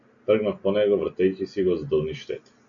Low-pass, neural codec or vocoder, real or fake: 7.2 kHz; none; real